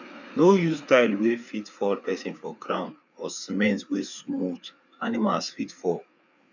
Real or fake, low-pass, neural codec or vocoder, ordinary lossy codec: fake; 7.2 kHz; codec, 16 kHz, 4 kbps, FreqCodec, larger model; none